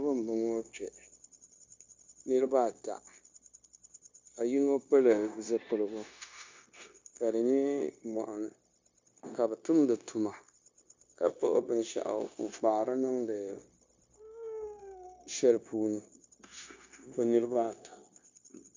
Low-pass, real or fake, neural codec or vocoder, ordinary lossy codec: 7.2 kHz; fake; codec, 16 kHz, 0.9 kbps, LongCat-Audio-Codec; MP3, 64 kbps